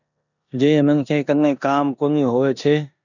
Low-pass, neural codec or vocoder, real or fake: 7.2 kHz; codec, 16 kHz in and 24 kHz out, 0.9 kbps, LongCat-Audio-Codec, four codebook decoder; fake